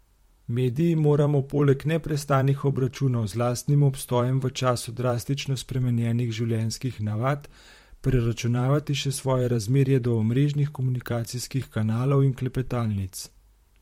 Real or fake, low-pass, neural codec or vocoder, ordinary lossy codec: fake; 19.8 kHz; vocoder, 44.1 kHz, 128 mel bands, Pupu-Vocoder; MP3, 64 kbps